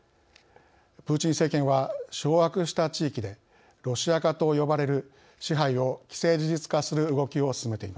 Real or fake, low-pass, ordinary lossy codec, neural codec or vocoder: real; none; none; none